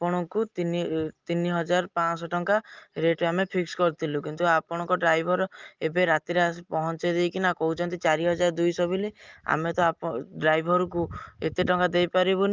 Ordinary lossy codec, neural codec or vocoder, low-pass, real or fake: Opus, 32 kbps; none; 7.2 kHz; real